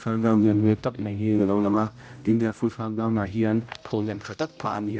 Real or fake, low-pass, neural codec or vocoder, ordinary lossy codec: fake; none; codec, 16 kHz, 0.5 kbps, X-Codec, HuBERT features, trained on general audio; none